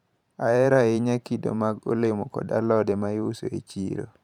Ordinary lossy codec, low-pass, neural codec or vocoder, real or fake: none; 19.8 kHz; vocoder, 44.1 kHz, 128 mel bands every 512 samples, BigVGAN v2; fake